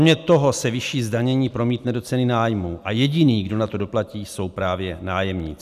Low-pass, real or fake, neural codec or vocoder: 14.4 kHz; real; none